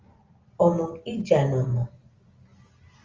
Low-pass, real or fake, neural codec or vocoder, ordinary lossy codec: 7.2 kHz; real; none; Opus, 32 kbps